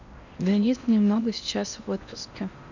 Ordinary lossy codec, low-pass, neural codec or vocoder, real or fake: none; 7.2 kHz; codec, 16 kHz in and 24 kHz out, 0.8 kbps, FocalCodec, streaming, 65536 codes; fake